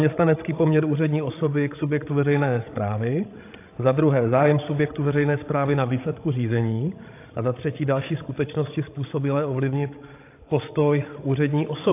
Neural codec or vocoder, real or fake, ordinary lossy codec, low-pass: codec, 16 kHz, 16 kbps, FreqCodec, larger model; fake; AAC, 24 kbps; 3.6 kHz